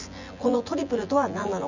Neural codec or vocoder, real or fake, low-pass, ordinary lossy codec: vocoder, 24 kHz, 100 mel bands, Vocos; fake; 7.2 kHz; none